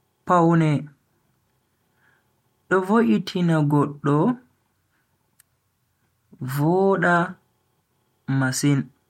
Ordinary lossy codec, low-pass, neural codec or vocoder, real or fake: MP3, 64 kbps; 19.8 kHz; none; real